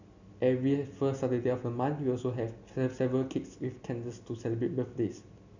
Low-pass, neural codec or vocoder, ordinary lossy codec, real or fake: 7.2 kHz; none; none; real